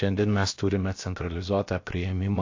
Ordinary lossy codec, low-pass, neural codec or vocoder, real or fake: AAC, 48 kbps; 7.2 kHz; codec, 16 kHz, about 1 kbps, DyCAST, with the encoder's durations; fake